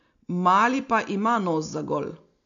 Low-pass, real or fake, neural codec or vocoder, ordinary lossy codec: 7.2 kHz; real; none; MP3, 64 kbps